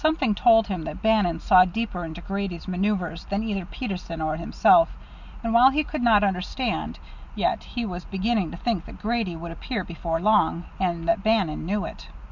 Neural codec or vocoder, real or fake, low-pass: none; real; 7.2 kHz